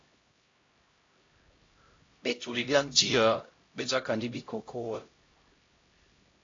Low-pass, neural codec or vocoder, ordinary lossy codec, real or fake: 7.2 kHz; codec, 16 kHz, 0.5 kbps, X-Codec, HuBERT features, trained on LibriSpeech; AAC, 32 kbps; fake